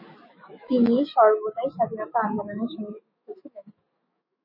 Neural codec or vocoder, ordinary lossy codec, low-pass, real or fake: autoencoder, 48 kHz, 128 numbers a frame, DAC-VAE, trained on Japanese speech; MP3, 24 kbps; 5.4 kHz; fake